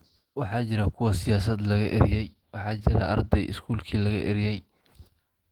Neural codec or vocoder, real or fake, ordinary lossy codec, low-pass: autoencoder, 48 kHz, 128 numbers a frame, DAC-VAE, trained on Japanese speech; fake; Opus, 16 kbps; 19.8 kHz